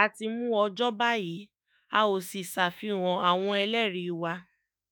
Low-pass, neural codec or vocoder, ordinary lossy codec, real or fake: none; autoencoder, 48 kHz, 32 numbers a frame, DAC-VAE, trained on Japanese speech; none; fake